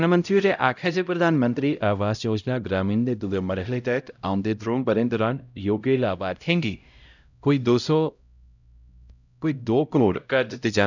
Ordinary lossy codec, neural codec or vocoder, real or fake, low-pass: none; codec, 16 kHz, 0.5 kbps, X-Codec, HuBERT features, trained on LibriSpeech; fake; 7.2 kHz